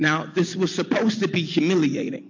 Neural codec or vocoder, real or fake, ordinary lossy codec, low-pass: none; real; MP3, 48 kbps; 7.2 kHz